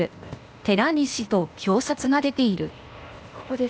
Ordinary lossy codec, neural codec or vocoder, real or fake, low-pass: none; codec, 16 kHz, 0.8 kbps, ZipCodec; fake; none